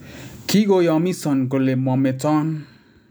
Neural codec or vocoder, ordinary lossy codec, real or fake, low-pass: none; none; real; none